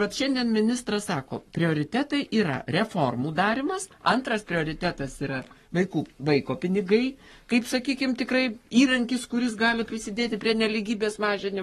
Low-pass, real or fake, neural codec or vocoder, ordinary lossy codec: 19.8 kHz; fake; codec, 44.1 kHz, 7.8 kbps, Pupu-Codec; AAC, 32 kbps